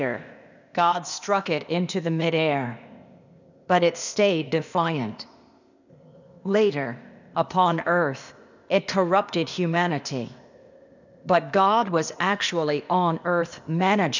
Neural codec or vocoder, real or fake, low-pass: codec, 16 kHz, 0.8 kbps, ZipCodec; fake; 7.2 kHz